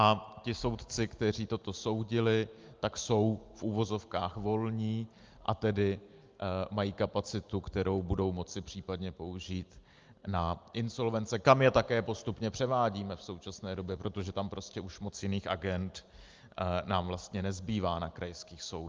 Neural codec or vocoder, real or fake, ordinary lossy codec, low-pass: none; real; Opus, 24 kbps; 7.2 kHz